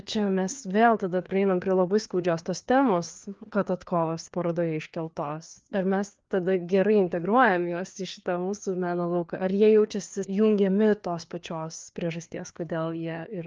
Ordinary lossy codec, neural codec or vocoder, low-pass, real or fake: Opus, 32 kbps; codec, 16 kHz, 2 kbps, FreqCodec, larger model; 7.2 kHz; fake